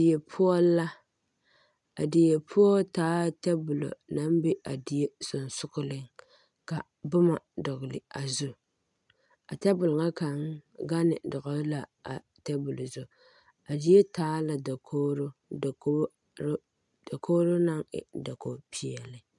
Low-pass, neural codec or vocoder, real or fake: 10.8 kHz; none; real